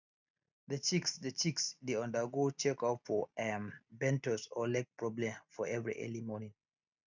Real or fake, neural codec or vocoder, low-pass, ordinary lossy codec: real; none; 7.2 kHz; none